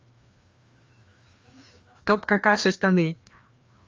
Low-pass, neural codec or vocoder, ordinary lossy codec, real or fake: 7.2 kHz; codec, 16 kHz, 2 kbps, FreqCodec, larger model; Opus, 32 kbps; fake